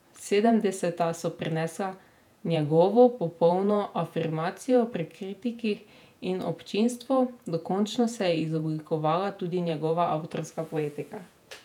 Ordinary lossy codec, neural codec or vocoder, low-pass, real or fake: none; vocoder, 48 kHz, 128 mel bands, Vocos; 19.8 kHz; fake